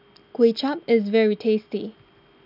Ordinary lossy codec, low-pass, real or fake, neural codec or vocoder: none; 5.4 kHz; real; none